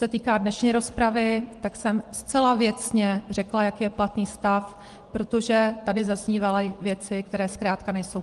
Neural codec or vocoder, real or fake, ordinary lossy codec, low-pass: vocoder, 24 kHz, 100 mel bands, Vocos; fake; Opus, 24 kbps; 10.8 kHz